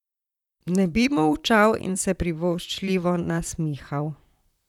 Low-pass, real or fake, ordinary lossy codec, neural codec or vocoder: 19.8 kHz; fake; none; vocoder, 44.1 kHz, 128 mel bands, Pupu-Vocoder